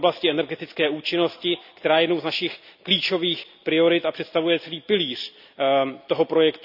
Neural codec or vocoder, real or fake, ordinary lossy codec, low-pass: none; real; none; 5.4 kHz